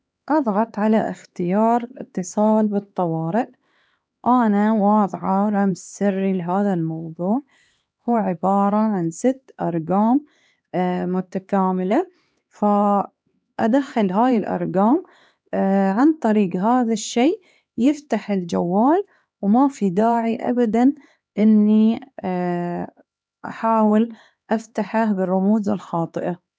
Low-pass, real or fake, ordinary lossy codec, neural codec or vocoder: none; fake; none; codec, 16 kHz, 2 kbps, X-Codec, HuBERT features, trained on LibriSpeech